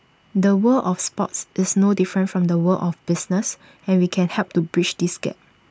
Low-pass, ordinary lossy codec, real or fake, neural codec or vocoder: none; none; real; none